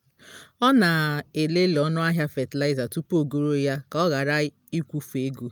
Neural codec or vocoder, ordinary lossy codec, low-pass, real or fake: none; none; none; real